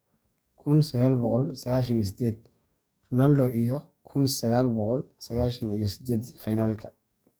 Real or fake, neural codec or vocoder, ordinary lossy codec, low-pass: fake; codec, 44.1 kHz, 2.6 kbps, DAC; none; none